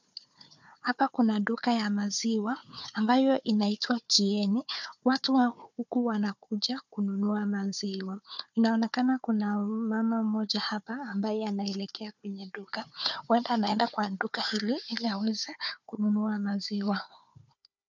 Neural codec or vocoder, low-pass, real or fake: codec, 16 kHz, 4 kbps, FunCodec, trained on Chinese and English, 50 frames a second; 7.2 kHz; fake